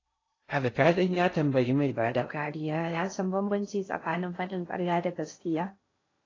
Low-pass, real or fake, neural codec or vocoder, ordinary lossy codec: 7.2 kHz; fake; codec, 16 kHz in and 24 kHz out, 0.6 kbps, FocalCodec, streaming, 2048 codes; AAC, 32 kbps